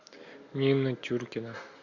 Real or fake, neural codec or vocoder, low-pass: real; none; 7.2 kHz